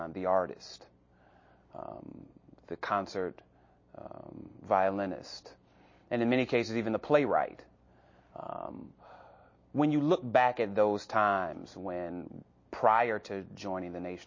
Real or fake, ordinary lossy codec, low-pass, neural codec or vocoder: real; MP3, 32 kbps; 7.2 kHz; none